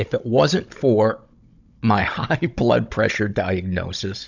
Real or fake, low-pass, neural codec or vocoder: fake; 7.2 kHz; codec, 16 kHz, 16 kbps, FunCodec, trained on Chinese and English, 50 frames a second